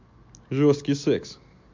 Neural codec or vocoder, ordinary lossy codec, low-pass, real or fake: none; MP3, 48 kbps; 7.2 kHz; real